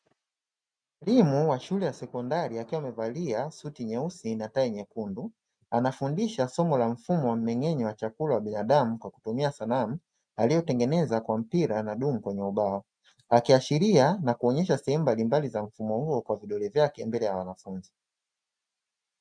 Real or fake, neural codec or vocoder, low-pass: real; none; 9.9 kHz